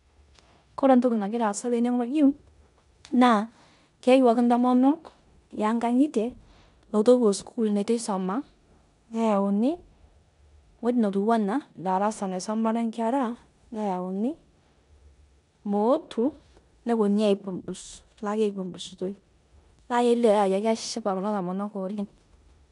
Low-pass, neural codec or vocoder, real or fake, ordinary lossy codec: 10.8 kHz; codec, 16 kHz in and 24 kHz out, 0.9 kbps, LongCat-Audio-Codec, four codebook decoder; fake; none